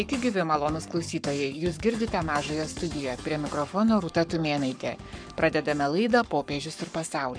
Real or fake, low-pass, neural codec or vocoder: fake; 9.9 kHz; codec, 44.1 kHz, 7.8 kbps, Pupu-Codec